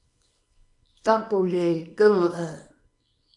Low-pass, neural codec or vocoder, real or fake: 10.8 kHz; codec, 24 kHz, 0.9 kbps, WavTokenizer, small release; fake